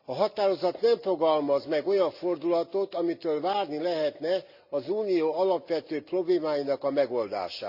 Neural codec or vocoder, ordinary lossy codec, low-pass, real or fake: none; Opus, 64 kbps; 5.4 kHz; real